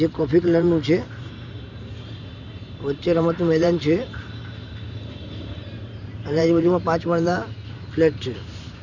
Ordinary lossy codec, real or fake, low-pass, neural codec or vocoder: none; fake; 7.2 kHz; vocoder, 44.1 kHz, 128 mel bands every 512 samples, BigVGAN v2